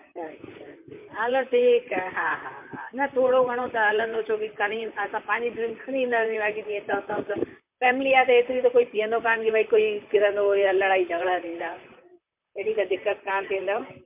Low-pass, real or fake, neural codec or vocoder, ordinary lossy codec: 3.6 kHz; fake; vocoder, 44.1 kHz, 128 mel bands, Pupu-Vocoder; MP3, 32 kbps